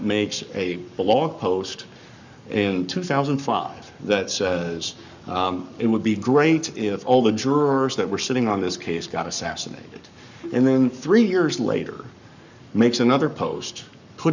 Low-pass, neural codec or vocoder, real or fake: 7.2 kHz; codec, 44.1 kHz, 7.8 kbps, Pupu-Codec; fake